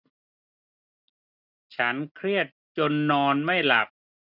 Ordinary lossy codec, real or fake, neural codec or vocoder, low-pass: none; real; none; 5.4 kHz